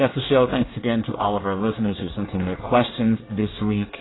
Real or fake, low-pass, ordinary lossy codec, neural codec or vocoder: fake; 7.2 kHz; AAC, 16 kbps; codec, 24 kHz, 1 kbps, SNAC